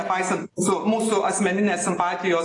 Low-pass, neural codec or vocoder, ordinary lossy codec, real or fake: 10.8 kHz; none; AAC, 32 kbps; real